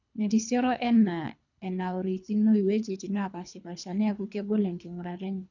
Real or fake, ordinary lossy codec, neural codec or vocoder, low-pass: fake; none; codec, 24 kHz, 3 kbps, HILCodec; 7.2 kHz